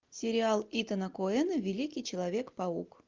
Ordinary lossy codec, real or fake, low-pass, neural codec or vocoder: Opus, 32 kbps; real; 7.2 kHz; none